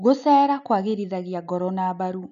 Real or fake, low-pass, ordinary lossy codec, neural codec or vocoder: real; 7.2 kHz; none; none